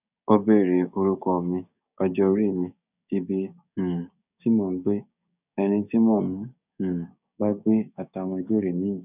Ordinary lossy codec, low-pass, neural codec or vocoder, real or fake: none; 3.6 kHz; codec, 16 kHz, 6 kbps, DAC; fake